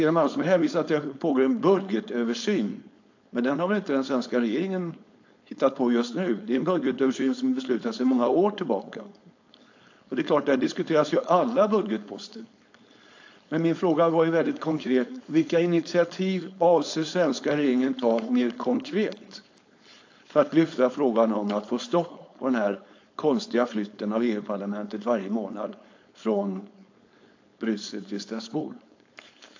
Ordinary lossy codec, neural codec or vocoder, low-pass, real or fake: AAC, 48 kbps; codec, 16 kHz, 4.8 kbps, FACodec; 7.2 kHz; fake